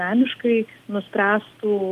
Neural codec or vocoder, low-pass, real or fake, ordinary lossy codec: none; 14.4 kHz; real; Opus, 32 kbps